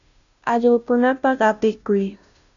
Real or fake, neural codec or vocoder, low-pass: fake; codec, 16 kHz, 0.5 kbps, FunCodec, trained on Chinese and English, 25 frames a second; 7.2 kHz